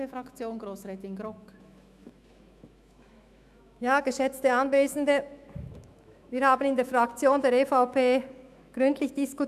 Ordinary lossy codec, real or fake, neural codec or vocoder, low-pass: none; fake; autoencoder, 48 kHz, 128 numbers a frame, DAC-VAE, trained on Japanese speech; 14.4 kHz